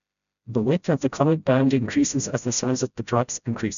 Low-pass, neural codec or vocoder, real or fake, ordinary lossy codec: 7.2 kHz; codec, 16 kHz, 0.5 kbps, FreqCodec, smaller model; fake; none